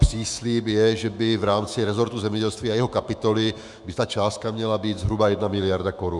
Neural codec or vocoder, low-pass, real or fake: autoencoder, 48 kHz, 128 numbers a frame, DAC-VAE, trained on Japanese speech; 10.8 kHz; fake